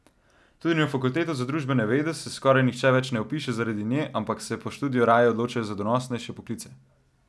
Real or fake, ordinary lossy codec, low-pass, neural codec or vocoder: real; none; none; none